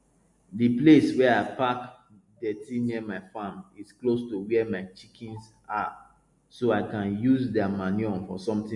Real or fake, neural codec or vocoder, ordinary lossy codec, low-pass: real; none; MP3, 48 kbps; 10.8 kHz